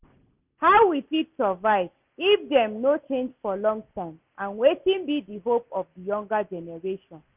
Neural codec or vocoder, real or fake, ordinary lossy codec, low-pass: none; real; none; 3.6 kHz